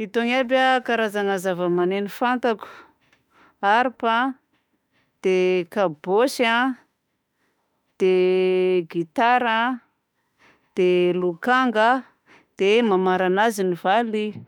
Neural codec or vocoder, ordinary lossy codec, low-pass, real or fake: autoencoder, 48 kHz, 32 numbers a frame, DAC-VAE, trained on Japanese speech; none; 19.8 kHz; fake